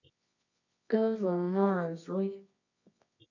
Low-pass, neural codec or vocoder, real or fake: 7.2 kHz; codec, 24 kHz, 0.9 kbps, WavTokenizer, medium music audio release; fake